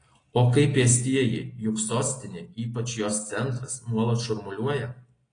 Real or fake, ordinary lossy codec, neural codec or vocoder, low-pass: real; AAC, 32 kbps; none; 9.9 kHz